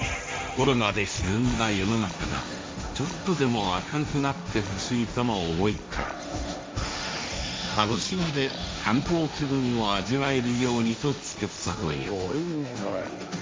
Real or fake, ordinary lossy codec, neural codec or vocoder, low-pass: fake; none; codec, 16 kHz, 1.1 kbps, Voila-Tokenizer; none